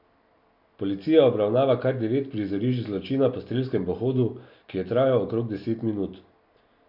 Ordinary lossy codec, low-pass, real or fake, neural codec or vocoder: none; 5.4 kHz; real; none